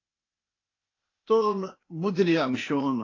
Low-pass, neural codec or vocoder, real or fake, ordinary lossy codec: 7.2 kHz; codec, 16 kHz, 0.8 kbps, ZipCodec; fake; AAC, 32 kbps